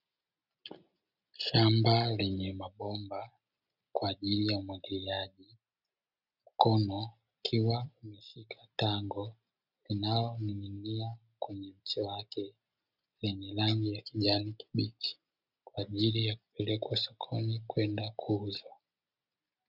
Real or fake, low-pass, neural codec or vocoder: real; 5.4 kHz; none